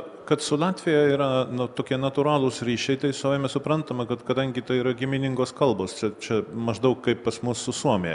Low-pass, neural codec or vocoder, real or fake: 10.8 kHz; none; real